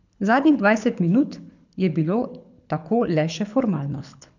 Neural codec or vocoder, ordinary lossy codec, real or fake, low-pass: codec, 24 kHz, 6 kbps, HILCodec; none; fake; 7.2 kHz